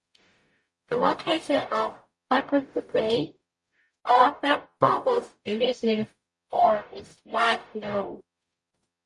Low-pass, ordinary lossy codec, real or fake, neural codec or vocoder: 10.8 kHz; MP3, 48 kbps; fake; codec, 44.1 kHz, 0.9 kbps, DAC